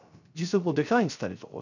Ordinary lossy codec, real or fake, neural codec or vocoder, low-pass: none; fake; codec, 16 kHz, 0.3 kbps, FocalCodec; 7.2 kHz